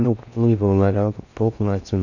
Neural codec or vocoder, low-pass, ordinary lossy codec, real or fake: codec, 16 kHz in and 24 kHz out, 0.6 kbps, FocalCodec, streaming, 4096 codes; 7.2 kHz; none; fake